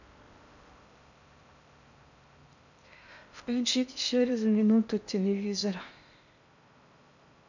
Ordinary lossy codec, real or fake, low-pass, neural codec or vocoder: none; fake; 7.2 kHz; codec, 16 kHz in and 24 kHz out, 0.6 kbps, FocalCodec, streaming, 4096 codes